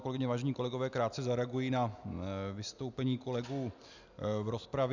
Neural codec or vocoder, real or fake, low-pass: none; real; 7.2 kHz